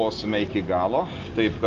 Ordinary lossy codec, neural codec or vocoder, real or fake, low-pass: Opus, 24 kbps; none; real; 7.2 kHz